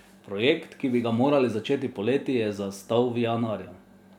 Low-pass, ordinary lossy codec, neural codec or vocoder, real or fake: 19.8 kHz; none; none; real